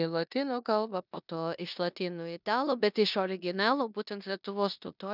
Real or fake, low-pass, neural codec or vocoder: fake; 5.4 kHz; codec, 16 kHz in and 24 kHz out, 0.9 kbps, LongCat-Audio-Codec, four codebook decoder